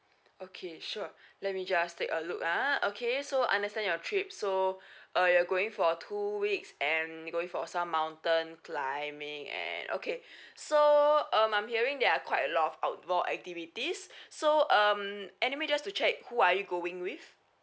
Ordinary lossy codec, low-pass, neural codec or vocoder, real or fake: none; none; none; real